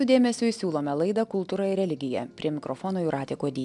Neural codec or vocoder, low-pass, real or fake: none; 10.8 kHz; real